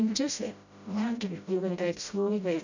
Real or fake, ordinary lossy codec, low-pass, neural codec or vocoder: fake; none; 7.2 kHz; codec, 16 kHz, 0.5 kbps, FreqCodec, smaller model